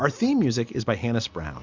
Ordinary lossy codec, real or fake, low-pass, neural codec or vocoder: Opus, 64 kbps; real; 7.2 kHz; none